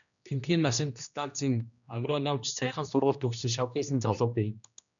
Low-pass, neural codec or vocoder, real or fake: 7.2 kHz; codec, 16 kHz, 1 kbps, X-Codec, HuBERT features, trained on general audio; fake